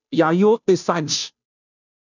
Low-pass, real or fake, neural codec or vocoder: 7.2 kHz; fake; codec, 16 kHz, 0.5 kbps, FunCodec, trained on Chinese and English, 25 frames a second